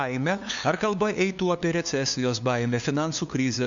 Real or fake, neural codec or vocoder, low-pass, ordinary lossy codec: fake; codec, 16 kHz, 2 kbps, FunCodec, trained on LibriTTS, 25 frames a second; 7.2 kHz; MP3, 64 kbps